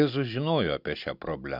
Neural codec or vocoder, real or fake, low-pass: none; real; 5.4 kHz